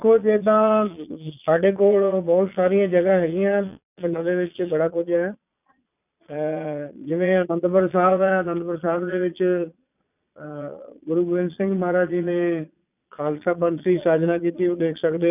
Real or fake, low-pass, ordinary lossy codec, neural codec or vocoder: fake; 3.6 kHz; none; vocoder, 22.05 kHz, 80 mel bands, Vocos